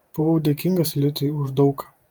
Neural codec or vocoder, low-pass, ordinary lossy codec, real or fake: vocoder, 48 kHz, 128 mel bands, Vocos; 19.8 kHz; Opus, 32 kbps; fake